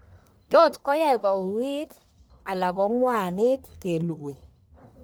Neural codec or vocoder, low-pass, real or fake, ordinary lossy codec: codec, 44.1 kHz, 1.7 kbps, Pupu-Codec; none; fake; none